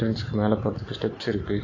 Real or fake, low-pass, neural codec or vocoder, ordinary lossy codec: real; 7.2 kHz; none; AAC, 32 kbps